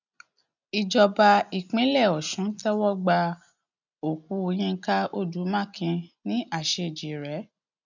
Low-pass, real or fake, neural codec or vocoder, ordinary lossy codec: 7.2 kHz; real; none; none